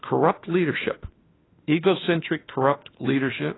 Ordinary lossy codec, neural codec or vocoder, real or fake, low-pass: AAC, 16 kbps; autoencoder, 48 kHz, 32 numbers a frame, DAC-VAE, trained on Japanese speech; fake; 7.2 kHz